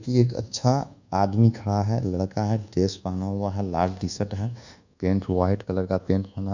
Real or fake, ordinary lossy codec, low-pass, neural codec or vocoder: fake; none; 7.2 kHz; codec, 24 kHz, 1.2 kbps, DualCodec